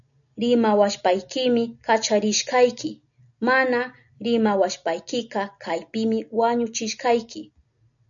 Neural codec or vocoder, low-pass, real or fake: none; 7.2 kHz; real